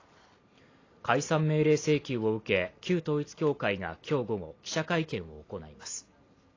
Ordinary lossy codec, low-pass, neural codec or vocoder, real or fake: AAC, 32 kbps; 7.2 kHz; none; real